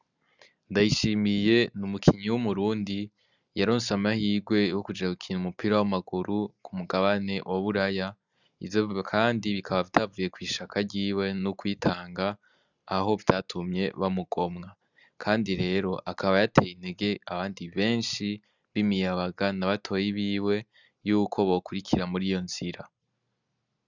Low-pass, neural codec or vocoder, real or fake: 7.2 kHz; none; real